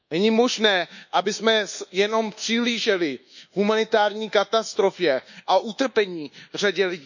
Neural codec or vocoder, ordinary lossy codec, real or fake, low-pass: codec, 24 kHz, 1.2 kbps, DualCodec; none; fake; 7.2 kHz